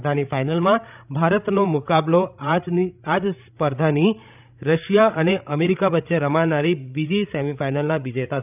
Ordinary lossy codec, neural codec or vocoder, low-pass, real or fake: none; codec, 16 kHz, 16 kbps, FreqCodec, larger model; 3.6 kHz; fake